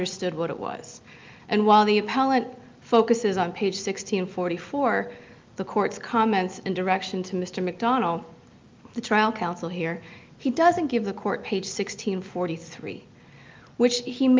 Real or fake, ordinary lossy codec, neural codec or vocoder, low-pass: real; Opus, 32 kbps; none; 7.2 kHz